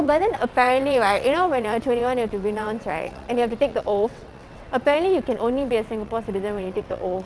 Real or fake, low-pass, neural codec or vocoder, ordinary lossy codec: fake; none; vocoder, 22.05 kHz, 80 mel bands, WaveNeXt; none